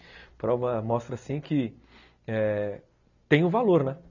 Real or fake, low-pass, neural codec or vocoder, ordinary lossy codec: real; 7.2 kHz; none; none